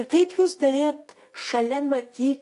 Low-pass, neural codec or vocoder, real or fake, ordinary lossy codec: 10.8 kHz; codec, 24 kHz, 0.9 kbps, WavTokenizer, medium music audio release; fake; AAC, 48 kbps